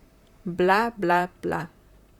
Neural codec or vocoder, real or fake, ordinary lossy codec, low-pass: vocoder, 44.1 kHz, 128 mel bands, Pupu-Vocoder; fake; Opus, 64 kbps; 19.8 kHz